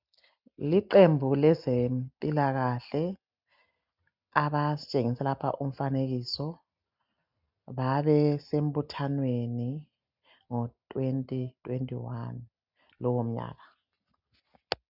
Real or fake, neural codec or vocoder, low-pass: real; none; 5.4 kHz